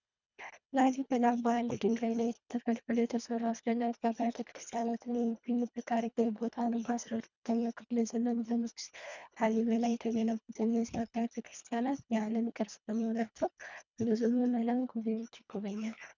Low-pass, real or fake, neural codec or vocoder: 7.2 kHz; fake; codec, 24 kHz, 1.5 kbps, HILCodec